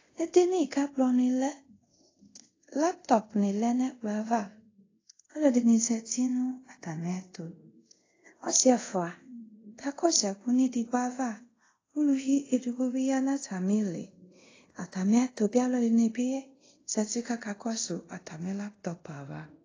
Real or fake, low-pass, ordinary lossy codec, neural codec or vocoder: fake; 7.2 kHz; AAC, 32 kbps; codec, 24 kHz, 0.5 kbps, DualCodec